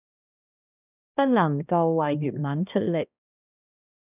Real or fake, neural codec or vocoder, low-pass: fake; codec, 16 kHz, 1 kbps, X-Codec, HuBERT features, trained on balanced general audio; 3.6 kHz